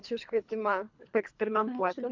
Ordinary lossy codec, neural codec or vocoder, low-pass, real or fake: MP3, 64 kbps; codec, 24 kHz, 3 kbps, HILCodec; 7.2 kHz; fake